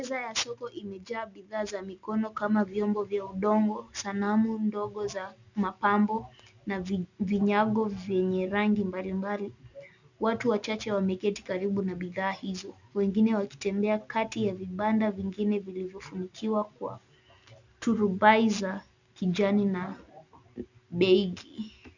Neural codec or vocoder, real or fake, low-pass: none; real; 7.2 kHz